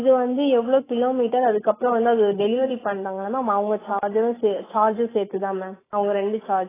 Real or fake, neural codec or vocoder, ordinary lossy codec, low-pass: fake; autoencoder, 48 kHz, 128 numbers a frame, DAC-VAE, trained on Japanese speech; AAC, 16 kbps; 3.6 kHz